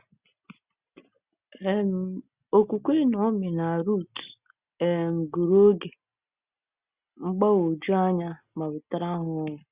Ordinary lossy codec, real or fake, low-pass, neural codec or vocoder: Opus, 64 kbps; real; 3.6 kHz; none